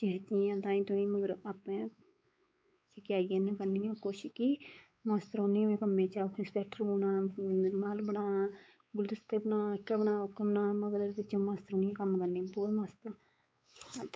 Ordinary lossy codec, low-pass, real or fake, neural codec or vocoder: none; none; fake; codec, 16 kHz, 4 kbps, X-Codec, WavLM features, trained on Multilingual LibriSpeech